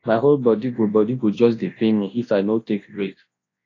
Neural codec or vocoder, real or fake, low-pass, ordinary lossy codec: codec, 24 kHz, 0.9 kbps, WavTokenizer, large speech release; fake; 7.2 kHz; AAC, 32 kbps